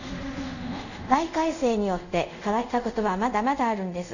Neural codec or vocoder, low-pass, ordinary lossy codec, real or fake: codec, 24 kHz, 0.5 kbps, DualCodec; 7.2 kHz; none; fake